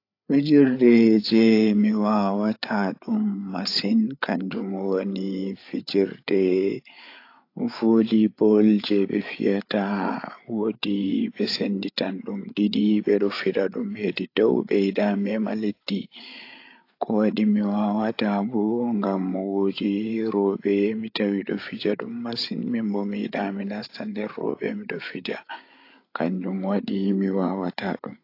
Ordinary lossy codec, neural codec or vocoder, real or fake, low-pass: AAC, 32 kbps; codec, 16 kHz, 8 kbps, FreqCodec, larger model; fake; 5.4 kHz